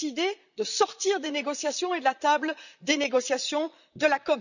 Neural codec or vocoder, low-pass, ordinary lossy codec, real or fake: vocoder, 44.1 kHz, 128 mel bands, Pupu-Vocoder; 7.2 kHz; none; fake